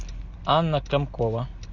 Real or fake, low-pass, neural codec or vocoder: real; 7.2 kHz; none